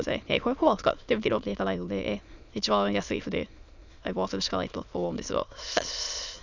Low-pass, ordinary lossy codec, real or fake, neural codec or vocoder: 7.2 kHz; none; fake; autoencoder, 22.05 kHz, a latent of 192 numbers a frame, VITS, trained on many speakers